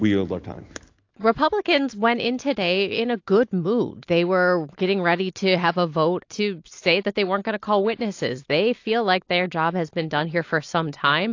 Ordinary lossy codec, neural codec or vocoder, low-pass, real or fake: AAC, 48 kbps; none; 7.2 kHz; real